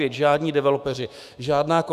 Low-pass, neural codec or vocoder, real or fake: 14.4 kHz; autoencoder, 48 kHz, 128 numbers a frame, DAC-VAE, trained on Japanese speech; fake